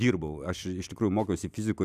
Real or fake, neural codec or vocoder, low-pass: real; none; 14.4 kHz